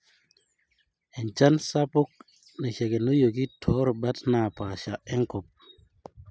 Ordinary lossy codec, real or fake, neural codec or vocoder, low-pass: none; real; none; none